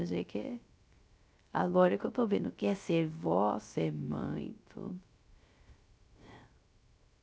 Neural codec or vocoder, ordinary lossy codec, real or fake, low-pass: codec, 16 kHz, 0.3 kbps, FocalCodec; none; fake; none